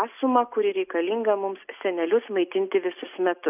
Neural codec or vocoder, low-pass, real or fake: none; 3.6 kHz; real